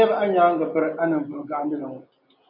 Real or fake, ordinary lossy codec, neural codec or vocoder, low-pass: real; MP3, 48 kbps; none; 5.4 kHz